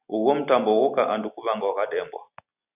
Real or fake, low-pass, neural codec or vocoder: real; 3.6 kHz; none